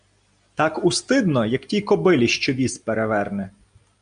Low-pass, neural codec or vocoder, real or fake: 9.9 kHz; none; real